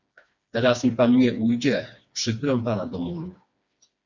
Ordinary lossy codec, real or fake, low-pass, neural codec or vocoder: Opus, 64 kbps; fake; 7.2 kHz; codec, 16 kHz, 2 kbps, FreqCodec, smaller model